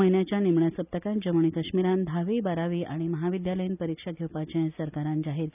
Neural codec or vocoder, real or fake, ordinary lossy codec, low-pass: none; real; none; 3.6 kHz